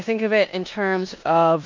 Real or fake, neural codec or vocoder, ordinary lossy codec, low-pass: fake; codec, 16 kHz in and 24 kHz out, 0.9 kbps, LongCat-Audio-Codec, four codebook decoder; MP3, 48 kbps; 7.2 kHz